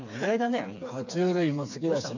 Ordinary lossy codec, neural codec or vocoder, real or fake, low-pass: none; codec, 16 kHz, 4 kbps, FreqCodec, smaller model; fake; 7.2 kHz